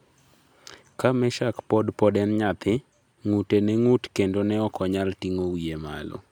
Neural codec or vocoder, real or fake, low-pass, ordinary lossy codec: none; real; 19.8 kHz; none